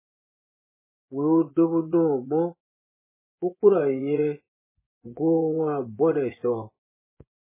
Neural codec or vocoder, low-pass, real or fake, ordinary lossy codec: vocoder, 24 kHz, 100 mel bands, Vocos; 3.6 kHz; fake; MP3, 16 kbps